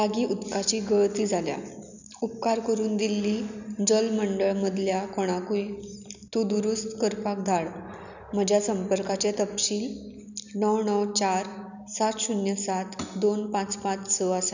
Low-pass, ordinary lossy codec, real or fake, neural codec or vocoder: 7.2 kHz; none; real; none